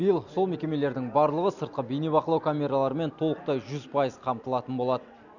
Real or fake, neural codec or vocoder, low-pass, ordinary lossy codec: real; none; 7.2 kHz; none